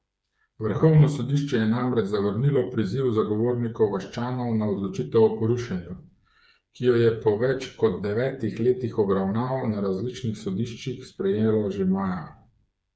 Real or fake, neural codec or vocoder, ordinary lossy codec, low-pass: fake; codec, 16 kHz, 4 kbps, FreqCodec, smaller model; none; none